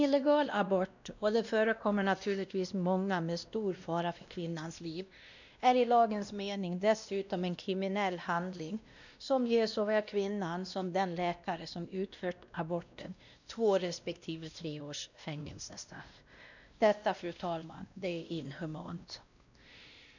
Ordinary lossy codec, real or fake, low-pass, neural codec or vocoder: none; fake; 7.2 kHz; codec, 16 kHz, 1 kbps, X-Codec, WavLM features, trained on Multilingual LibriSpeech